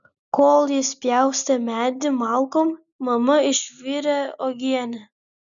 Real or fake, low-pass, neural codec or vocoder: real; 7.2 kHz; none